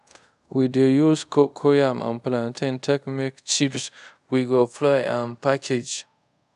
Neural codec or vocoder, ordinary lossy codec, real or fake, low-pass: codec, 24 kHz, 0.5 kbps, DualCodec; none; fake; 10.8 kHz